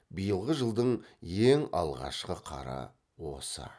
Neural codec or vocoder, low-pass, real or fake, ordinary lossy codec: none; none; real; none